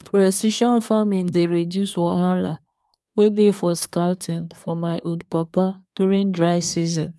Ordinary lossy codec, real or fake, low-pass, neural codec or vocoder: none; fake; none; codec, 24 kHz, 1 kbps, SNAC